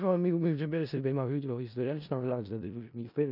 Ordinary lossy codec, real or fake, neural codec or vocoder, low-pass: none; fake; codec, 16 kHz in and 24 kHz out, 0.4 kbps, LongCat-Audio-Codec, four codebook decoder; 5.4 kHz